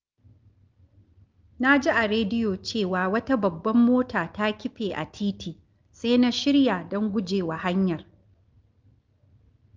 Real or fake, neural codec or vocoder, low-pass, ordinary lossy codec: real; none; 7.2 kHz; Opus, 32 kbps